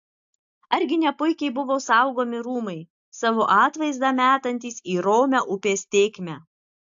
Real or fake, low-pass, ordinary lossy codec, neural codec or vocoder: real; 7.2 kHz; AAC, 64 kbps; none